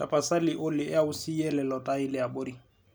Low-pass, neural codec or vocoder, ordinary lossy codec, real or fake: none; none; none; real